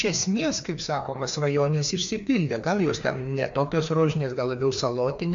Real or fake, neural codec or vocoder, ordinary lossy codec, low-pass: fake; codec, 16 kHz, 2 kbps, FreqCodec, larger model; AAC, 64 kbps; 7.2 kHz